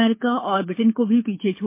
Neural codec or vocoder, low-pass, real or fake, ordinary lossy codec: codec, 24 kHz, 6 kbps, HILCodec; 3.6 kHz; fake; MP3, 24 kbps